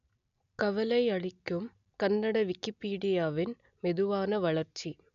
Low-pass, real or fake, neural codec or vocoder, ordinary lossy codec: 7.2 kHz; real; none; none